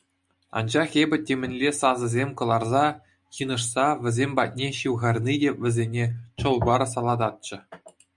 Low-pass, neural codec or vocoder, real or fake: 10.8 kHz; none; real